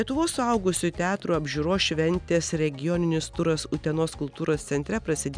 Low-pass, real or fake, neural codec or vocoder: 9.9 kHz; real; none